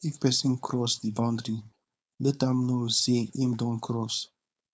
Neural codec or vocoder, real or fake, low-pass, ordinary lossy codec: codec, 16 kHz, 4.8 kbps, FACodec; fake; none; none